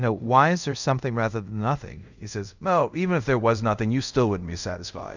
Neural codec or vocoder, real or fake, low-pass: codec, 24 kHz, 0.5 kbps, DualCodec; fake; 7.2 kHz